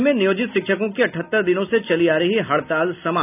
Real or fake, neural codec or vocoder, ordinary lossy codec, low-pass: real; none; none; 3.6 kHz